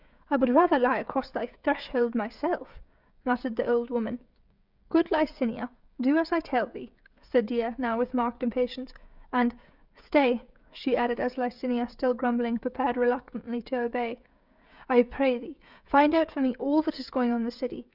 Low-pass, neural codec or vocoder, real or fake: 5.4 kHz; codec, 16 kHz, 16 kbps, FreqCodec, smaller model; fake